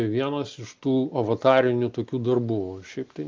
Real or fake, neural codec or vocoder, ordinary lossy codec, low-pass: real; none; Opus, 32 kbps; 7.2 kHz